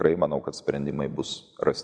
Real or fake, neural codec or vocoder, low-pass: real; none; 9.9 kHz